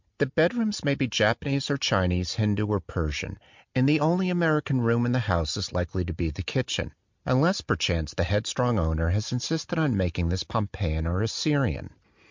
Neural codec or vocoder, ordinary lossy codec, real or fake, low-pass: none; MP3, 64 kbps; real; 7.2 kHz